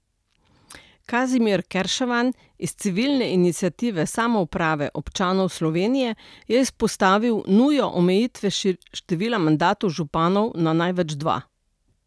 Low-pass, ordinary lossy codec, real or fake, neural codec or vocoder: none; none; real; none